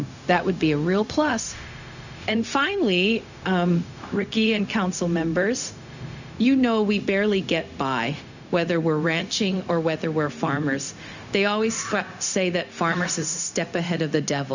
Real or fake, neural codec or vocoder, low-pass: fake; codec, 16 kHz, 0.4 kbps, LongCat-Audio-Codec; 7.2 kHz